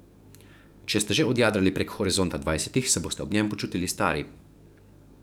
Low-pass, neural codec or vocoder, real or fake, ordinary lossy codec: none; codec, 44.1 kHz, 7.8 kbps, DAC; fake; none